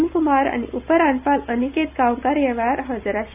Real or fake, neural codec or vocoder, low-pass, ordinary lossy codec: real; none; 3.6 kHz; none